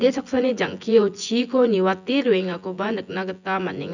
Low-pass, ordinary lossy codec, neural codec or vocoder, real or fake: 7.2 kHz; none; vocoder, 24 kHz, 100 mel bands, Vocos; fake